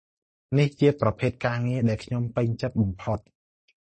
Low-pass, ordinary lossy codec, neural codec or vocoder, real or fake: 10.8 kHz; MP3, 32 kbps; none; real